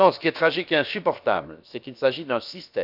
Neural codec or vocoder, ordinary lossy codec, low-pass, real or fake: codec, 16 kHz, about 1 kbps, DyCAST, with the encoder's durations; none; 5.4 kHz; fake